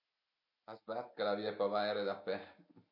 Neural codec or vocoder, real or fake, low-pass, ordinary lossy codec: none; real; 5.4 kHz; MP3, 32 kbps